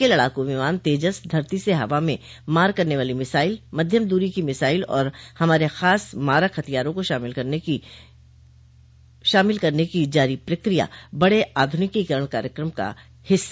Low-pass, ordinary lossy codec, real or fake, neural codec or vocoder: none; none; real; none